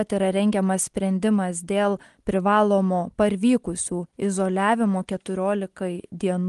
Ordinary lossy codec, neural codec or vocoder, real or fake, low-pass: Opus, 24 kbps; none; real; 10.8 kHz